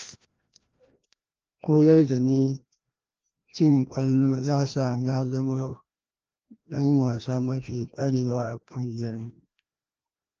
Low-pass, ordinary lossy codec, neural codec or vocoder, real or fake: 7.2 kHz; Opus, 24 kbps; codec, 16 kHz, 1 kbps, FreqCodec, larger model; fake